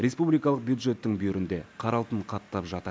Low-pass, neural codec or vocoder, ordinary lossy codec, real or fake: none; none; none; real